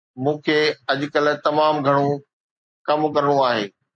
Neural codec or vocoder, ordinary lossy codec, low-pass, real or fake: none; MP3, 48 kbps; 9.9 kHz; real